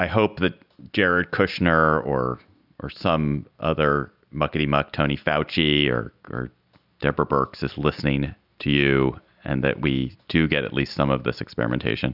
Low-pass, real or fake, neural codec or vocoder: 5.4 kHz; real; none